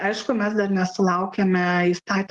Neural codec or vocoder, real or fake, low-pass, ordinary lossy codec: none; real; 10.8 kHz; Opus, 24 kbps